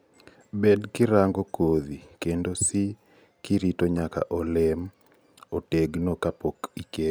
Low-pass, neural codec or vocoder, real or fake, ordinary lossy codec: none; none; real; none